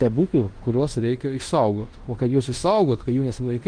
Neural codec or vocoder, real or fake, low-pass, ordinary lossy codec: codec, 16 kHz in and 24 kHz out, 0.9 kbps, LongCat-Audio-Codec, fine tuned four codebook decoder; fake; 9.9 kHz; Opus, 24 kbps